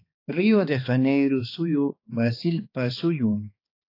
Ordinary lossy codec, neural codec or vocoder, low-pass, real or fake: AAC, 32 kbps; codec, 16 kHz, 2 kbps, X-Codec, HuBERT features, trained on balanced general audio; 5.4 kHz; fake